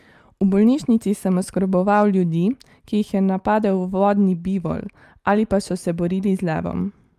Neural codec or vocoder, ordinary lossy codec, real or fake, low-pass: none; Opus, 32 kbps; real; 14.4 kHz